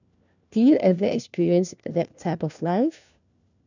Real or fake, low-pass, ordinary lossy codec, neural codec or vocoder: fake; 7.2 kHz; none; codec, 16 kHz, 1 kbps, FunCodec, trained on LibriTTS, 50 frames a second